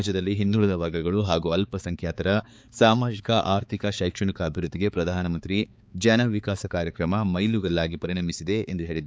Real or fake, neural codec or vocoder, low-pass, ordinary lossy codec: fake; codec, 16 kHz, 4 kbps, X-Codec, HuBERT features, trained on balanced general audio; none; none